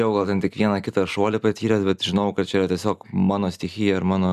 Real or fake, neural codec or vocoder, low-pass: real; none; 14.4 kHz